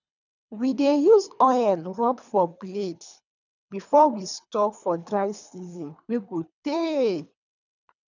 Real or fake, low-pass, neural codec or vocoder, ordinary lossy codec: fake; 7.2 kHz; codec, 24 kHz, 3 kbps, HILCodec; none